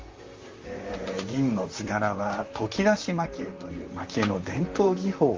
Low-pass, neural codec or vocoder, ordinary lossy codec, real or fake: 7.2 kHz; vocoder, 44.1 kHz, 128 mel bands, Pupu-Vocoder; Opus, 32 kbps; fake